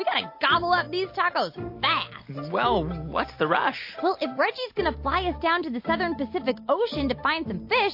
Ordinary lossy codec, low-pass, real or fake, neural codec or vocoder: MP3, 32 kbps; 5.4 kHz; real; none